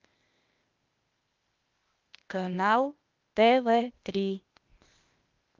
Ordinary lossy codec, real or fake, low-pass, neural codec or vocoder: Opus, 24 kbps; fake; 7.2 kHz; codec, 16 kHz, 0.8 kbps, ZipCodec